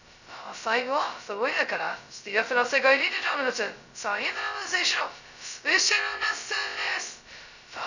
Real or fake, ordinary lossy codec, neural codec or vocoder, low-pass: fake; none; codec, 16 kHz, 0.2 kbps, FocalCodec; 7.2 kHz